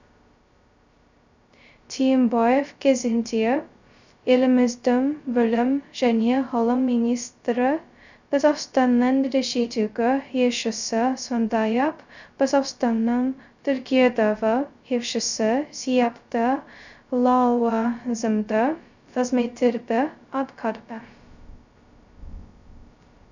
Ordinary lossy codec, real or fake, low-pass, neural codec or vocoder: none; fake; 7.2 kHz; codec, 16 kHz, 0.2 kbps, FocalCodec